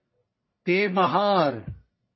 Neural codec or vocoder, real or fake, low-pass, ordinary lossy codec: codec, 44.1 kHz, 1.7 kbps, Pupu-Codec; fake; 7.2 kHz; MP3, 24 kbps